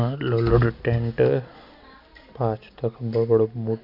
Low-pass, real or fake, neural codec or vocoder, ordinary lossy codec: 5.4 kHz; real; none; none